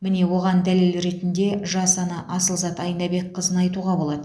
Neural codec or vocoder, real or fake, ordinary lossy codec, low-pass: none; real; none; none